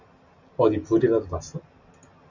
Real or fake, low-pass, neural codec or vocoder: real; 7.2 kHz; none